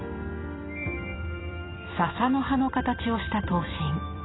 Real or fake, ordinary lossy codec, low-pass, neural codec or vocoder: fake; AAC, 16 kbps; 7.2 kHz; vocoder, 44.1 kHz, 128 mel bands every 256 samples, BigVGAN v2